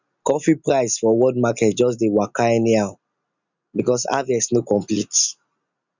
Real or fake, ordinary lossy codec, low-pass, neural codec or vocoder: real; none; 7.2 kHz; none